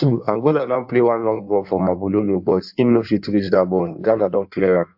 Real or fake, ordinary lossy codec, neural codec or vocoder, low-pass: fake; AAC, 48 kbps; codec, 16 kHz in and 24 kHz out, 1.1 kbps, FireRedTTS-2 codec; 5.4 kHz